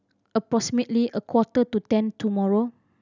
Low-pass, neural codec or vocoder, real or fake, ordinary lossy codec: 7.2 kHz; none; real; none